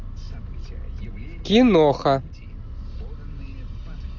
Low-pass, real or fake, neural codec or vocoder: 7.2 kHz; real; none